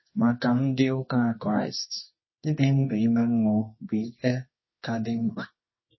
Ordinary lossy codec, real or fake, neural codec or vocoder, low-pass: MP3, 24 kbps; fake; codec, 24 kHz, 0.9 kbps, WavTokenizer, medium music audio release; 7.2 kHz